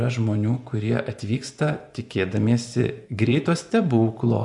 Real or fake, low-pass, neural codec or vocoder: fake; 10.8 kHz; vocoder, 24 kHz, 100 mel bands, Vocos